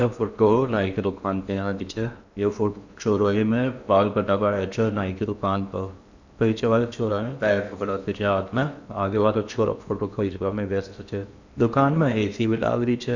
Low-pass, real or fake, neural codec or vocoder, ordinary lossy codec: 7.2 kHz; fake; codec, 16 kHz in and 24 kHz out, 0.8 kbps, FocalCodec, streaming, 65536 codes; none